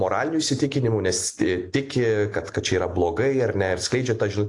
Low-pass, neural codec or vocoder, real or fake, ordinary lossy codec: 10.8 kHz; none; real; AAC, 48 kbps